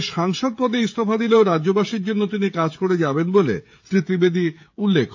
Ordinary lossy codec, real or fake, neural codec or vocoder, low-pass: AAC, 48 kbps; fake; codec, 16 kHz, 16 kbps, FreqCodec, smaller model; 7.2 kHz